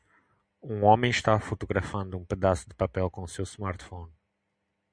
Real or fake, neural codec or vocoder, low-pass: real; none; 9.9 kHz